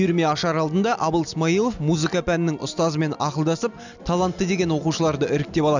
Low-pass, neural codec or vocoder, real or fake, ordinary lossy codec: 7.2 kHz; none; real; none